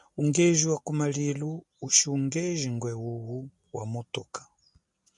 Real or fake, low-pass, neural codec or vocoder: real; 10.8 kHz; none